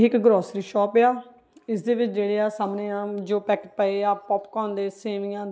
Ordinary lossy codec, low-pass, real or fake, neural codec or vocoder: none; none; real; none